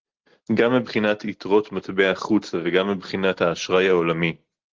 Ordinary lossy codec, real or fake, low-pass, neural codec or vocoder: Opus, 16 kbps; real; 7.2 kHz; none